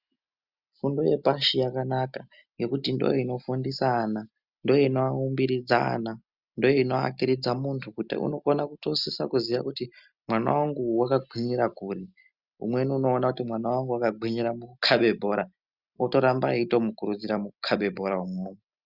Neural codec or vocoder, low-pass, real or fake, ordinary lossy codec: none; 5.4 kHz; real; Opus, 64 kbps